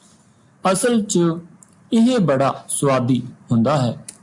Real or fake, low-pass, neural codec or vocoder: real; 10.8 kHz; none